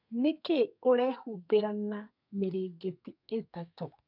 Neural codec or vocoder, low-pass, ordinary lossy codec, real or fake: codec, 32 kHz, 1.9 kbps, SNAC; 5.4 kHz; none; fake